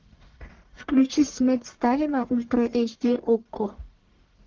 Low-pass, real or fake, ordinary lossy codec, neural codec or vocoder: 7.2 kHz; fake; Opus, 16 kbps; codec, 44.1 kHz, 1.7 kbps, Pupu-Codec